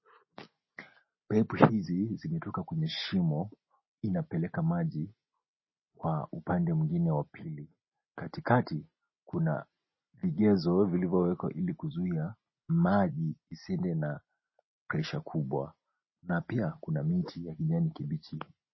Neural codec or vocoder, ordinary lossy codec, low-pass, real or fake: none; MP3, 24 kbps; 7.2 kHz; real